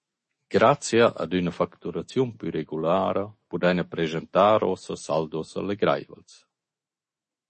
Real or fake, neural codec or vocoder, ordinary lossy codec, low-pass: fake; autoencoder, 48 kHz, 128 numbers a frame, DAC-VAE, trained on Japanese speech; MP3, 32 kbps; 10.8 kHz